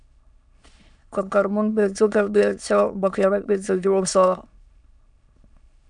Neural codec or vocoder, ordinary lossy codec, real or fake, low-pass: autoencoder, 22.05 kHz, a latent of 192 numbers a frame, VITS, trained on many speakers; MP3, 96 kbps; fake; 9.9 kHz